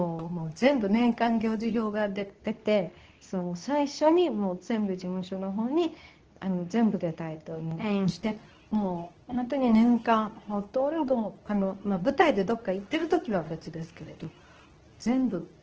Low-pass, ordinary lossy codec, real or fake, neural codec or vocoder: 7.2 kHz; Opus, 16 kbps; fake; codec, 24 kHz, 0.9 kbps, WavTokenizer, medium speech release version 2